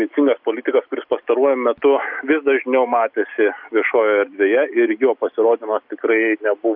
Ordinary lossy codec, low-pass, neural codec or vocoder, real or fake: AAC, 48 kbps; 5.4 kHz; none; real